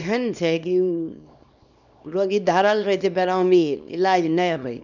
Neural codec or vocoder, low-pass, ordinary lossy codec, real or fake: codec, 24 kHz, 0.9 kbps, WavTokenizer, small release; 7.2 kHz; none; fake